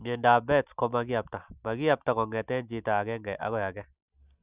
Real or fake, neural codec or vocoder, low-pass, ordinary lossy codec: real; none; 3.6 kHz; Opus, 64 kbps